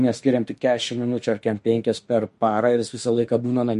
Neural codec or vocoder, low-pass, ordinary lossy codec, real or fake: autoencoder, 48 kHz, 32 numbers a frame, DAC-VAE, trained on Japanese speech; 14.4 kHz; MP3, 48 kbps; fake